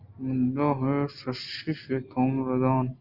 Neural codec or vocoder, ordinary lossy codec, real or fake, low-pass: none; Opus, 24 kbps; real; 5.4 kHz